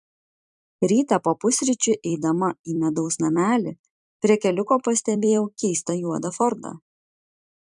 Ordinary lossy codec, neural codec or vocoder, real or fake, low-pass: MP3, 96 kbps; vocoder, 44.1 kHz, 128 mel bands every 256 samples, BigVGAN v2; fake; 10.8 kHz